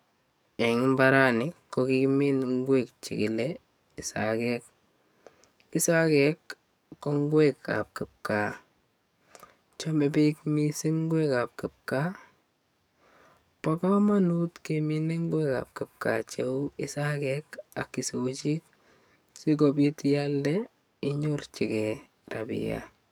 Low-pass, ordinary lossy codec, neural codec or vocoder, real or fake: none; none; codec, 44.1 kHz, 7.8 kbps, DAC; fake